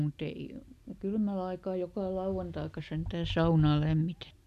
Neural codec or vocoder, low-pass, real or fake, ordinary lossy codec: none; 14.4 kHz; real; none